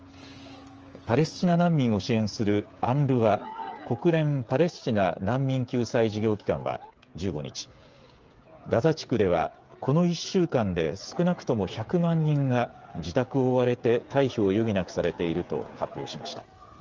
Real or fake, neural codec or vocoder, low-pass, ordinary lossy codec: fake; codec, 16 kHz, 8 kbps, FreqCodec, smaller model; 7.2 kHz; Opus, 24 kbps